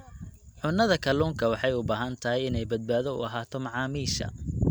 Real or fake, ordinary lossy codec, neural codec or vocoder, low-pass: real; none; none; none